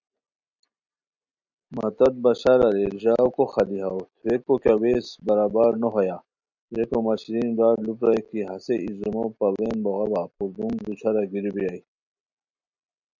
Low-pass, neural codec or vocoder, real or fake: 7.2 kHz; none; real